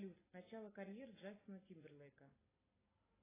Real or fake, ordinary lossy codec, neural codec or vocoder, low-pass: real; AAC, 16 kbps; none; 3.6 kHz